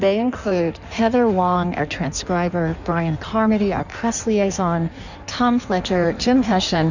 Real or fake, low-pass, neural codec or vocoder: fake; 7.2 kHz; codec, 16 kHz in and 24 kHz out, 1.1 kbps, FireRedTTS-2 codec